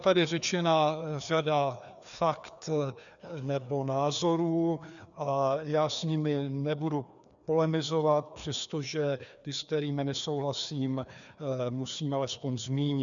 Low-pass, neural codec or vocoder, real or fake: 7.2 kHz; codec, 16 kHz, 2 kbps, FreqCodec, larger model; fake